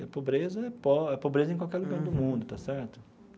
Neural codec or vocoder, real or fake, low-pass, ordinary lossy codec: none; real; none; none